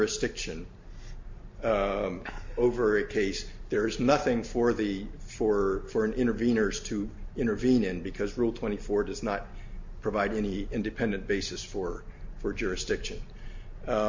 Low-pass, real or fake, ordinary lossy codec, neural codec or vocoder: 7.2 kHz; real; MP3, 48 kbps; none